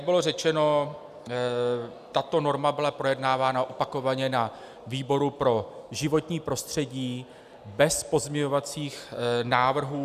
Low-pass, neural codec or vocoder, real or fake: 14.4 kHz; none; real